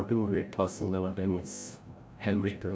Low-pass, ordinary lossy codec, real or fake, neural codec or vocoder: none; none; fake; codec, 16 kHz, 0.5 kbps, FreqCodec, larger model